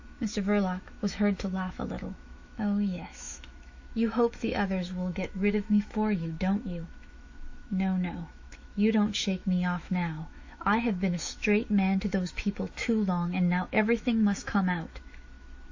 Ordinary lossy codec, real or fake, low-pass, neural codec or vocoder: AAC, 48 kbps; fake; 7.2 kHz; autoencoder, 48 kHz, 128 numbers a frame, DAC-VAE, trained on Japanese speech